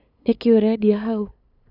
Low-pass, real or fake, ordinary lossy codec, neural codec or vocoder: 5.4 kHz; fake; none; codec, 16 kHz, 8 kbps, FunCodec, trained on LibriTTS, 25 frames a second